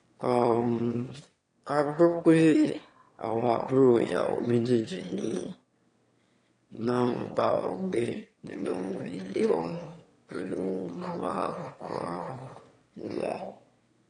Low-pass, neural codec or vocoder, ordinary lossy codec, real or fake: 9.9 kHz; autoencoder, 22.05 kHz, a latent of 192 numbers a frame, VITS, trained on one speaker; AAC, 48 kbps; fake